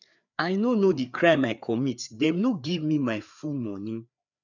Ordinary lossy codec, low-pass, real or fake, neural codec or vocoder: none; 7.2 kHz; fake; codec, 16 kHz, 4 kbps, FreqCodec, larger model